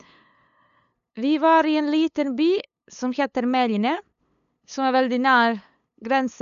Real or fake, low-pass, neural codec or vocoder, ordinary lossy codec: fake; 7.2 kHz; codec, 16 kHz, 8 kbps, FunCodec, trained on LibriTTS, 25 frames a second; none